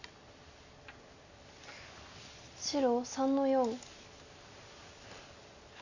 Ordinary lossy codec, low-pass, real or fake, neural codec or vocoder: none; 7.2 kHz; real; none